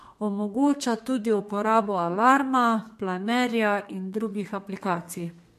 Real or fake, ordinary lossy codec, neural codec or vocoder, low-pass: fake; MP3, 64 kbps; codec, 32 kHz, 1.9 kbps, SNAC; 14.4 kHz